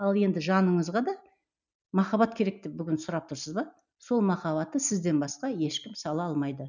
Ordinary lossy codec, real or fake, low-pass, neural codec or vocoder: none; real; 7.2 kHz; none